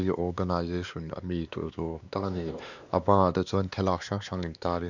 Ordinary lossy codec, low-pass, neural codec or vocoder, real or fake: none; 7.2 kHz; codec, 16 kHz, 2 kbps, X-Codec, WavLM features, trained on Multilingual LibriSpeech; fake